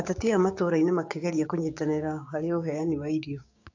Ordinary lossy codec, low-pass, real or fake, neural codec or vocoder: AAC, 48 kbps; 7.2 kHz; fake; codec, 16 kHz, 6 kbps, DAC